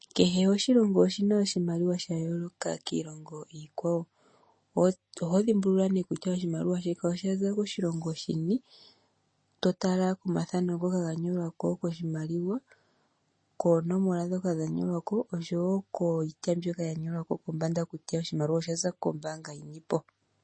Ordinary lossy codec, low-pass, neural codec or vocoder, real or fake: MP3, 32 kbps; 9.9 kHz; none; real